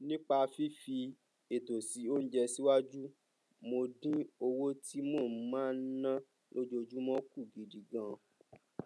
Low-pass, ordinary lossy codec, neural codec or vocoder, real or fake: none; none; none; real